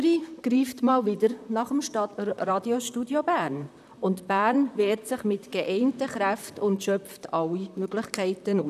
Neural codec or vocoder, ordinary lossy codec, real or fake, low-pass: vocoder, 44.1 kHz, 128 mel bands, Pupu-Vocoder; none; fake; 14.4 kHz